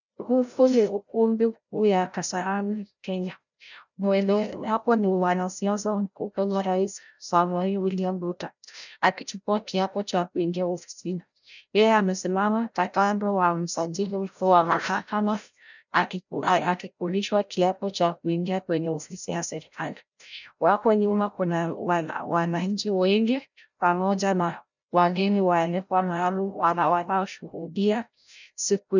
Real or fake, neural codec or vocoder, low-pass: fake; codec, 16 kHz, 0.5 kbps, FreqCodec, larger model; 7.2 kHz